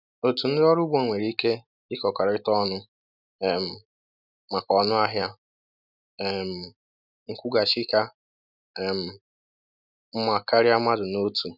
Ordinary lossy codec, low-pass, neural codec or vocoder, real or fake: none; 5.4 kHz; none; real